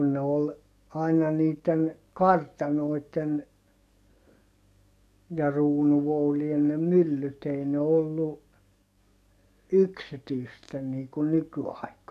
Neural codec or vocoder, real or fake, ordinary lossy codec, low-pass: codec, 44.1 kHz, 7.8 kbps, DAC; fake; none; 14.4 kHz